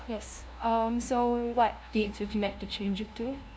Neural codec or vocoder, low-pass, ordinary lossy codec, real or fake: codec, 16 kHz, 0.5 kbps, FunCodec, trained on LibriTTS, 25 frames a second; none; none; fake